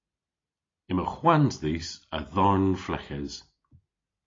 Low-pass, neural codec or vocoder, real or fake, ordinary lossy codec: 7.2 kHz; none; real; AAC, 32 kbps